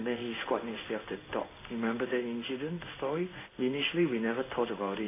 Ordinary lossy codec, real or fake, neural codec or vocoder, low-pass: AAC, 24 kbps; fake; codec, 16 kHz in and 24 kHz out, 1 kbps, XY-Tokenizer; 3.6 kHz